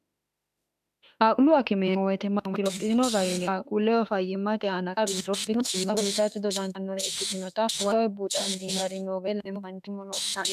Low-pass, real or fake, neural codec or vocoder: 14.4 kHz; fake; autoencoder, 48 kHz, 32 numbers a frame, DAC-VAE, trained on Japanese speech